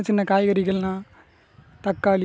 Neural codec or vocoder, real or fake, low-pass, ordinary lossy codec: none; real; none; none